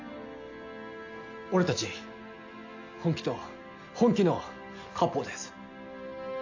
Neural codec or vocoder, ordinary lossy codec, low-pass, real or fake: none; AAC, 48 kbps; 7.2 kHz; real